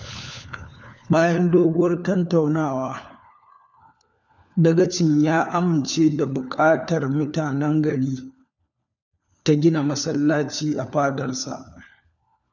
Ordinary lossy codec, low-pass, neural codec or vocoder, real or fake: none; 7.2 kHz; codec, 16 kHz, 4 kbps, FunCodec, trained on LibriTTS, 50 frames a second; fake